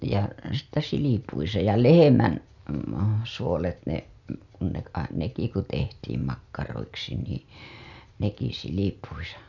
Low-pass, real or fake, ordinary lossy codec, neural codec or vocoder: 7.2 kHz; real; none; none